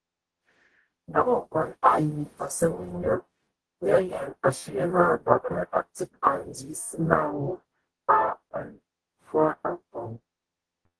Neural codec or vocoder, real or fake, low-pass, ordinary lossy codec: codec, 44.1 kHz, 0.9 kbps, DAC; fake; 10.8 kHz; Opus, 16 kbps